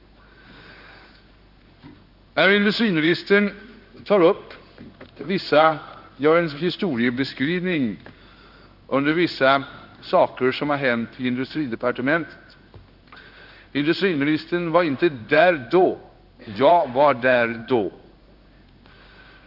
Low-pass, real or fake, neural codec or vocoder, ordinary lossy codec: 5.4 kHz; fake; codec, 16 kHz in and 24 kHz out, 1 kbps, XY-Tokenizer; none